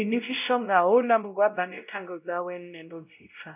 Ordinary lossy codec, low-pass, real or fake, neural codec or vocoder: none; 3.6 kHz; fake; codec, 16 kHz, 0.5 kbps, X-Codec, WavLM features, trained on Multilingual LibriSpeech